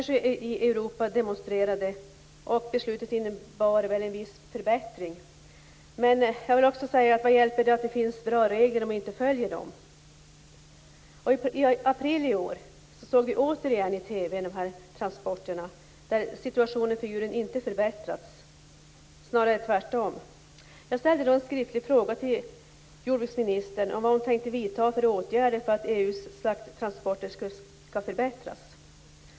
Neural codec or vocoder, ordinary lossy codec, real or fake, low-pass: none; none; real; none